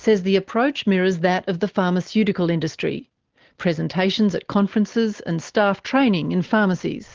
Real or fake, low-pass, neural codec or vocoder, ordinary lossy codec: real; 7.2 kHz; none; Opus, 16 kbps